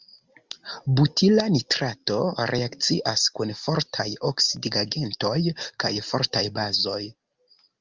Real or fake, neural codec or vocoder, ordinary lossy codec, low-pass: real; none; Opus, 32 kbps; 7.2 kHz